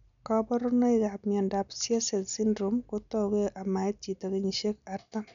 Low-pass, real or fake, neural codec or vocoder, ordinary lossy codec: 7.2 kHz; real; none; none